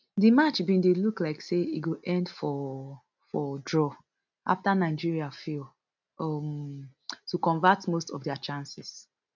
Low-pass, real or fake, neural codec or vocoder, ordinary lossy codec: 7.2 kHz; real; none; none